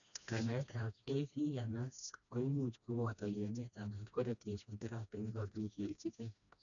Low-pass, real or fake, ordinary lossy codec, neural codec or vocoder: 7.2 kHz; fake; none; codec, 16 kHz, 1 kbps, FreqCodec, smaller model